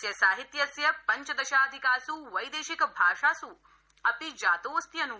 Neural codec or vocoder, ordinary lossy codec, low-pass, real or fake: none; none; none; real